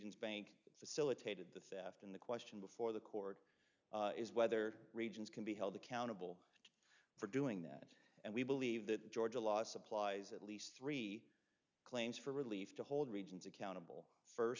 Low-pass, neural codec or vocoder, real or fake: 7.2 kHz; none; real